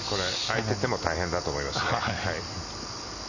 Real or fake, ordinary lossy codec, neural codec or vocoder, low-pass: real; none; none; 7.2 kHz